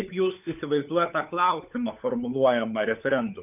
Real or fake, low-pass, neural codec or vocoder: fake; 3.6 kHz; codec, 16 kHz, 4 kbps, FunCodec, trained on LibriTTS, 50 frames a second